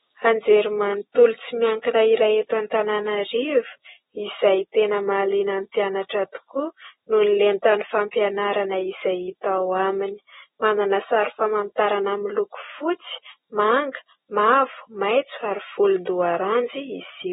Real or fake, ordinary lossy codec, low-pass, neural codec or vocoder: real; AAC, 16 kbps; 19.8 kHz; none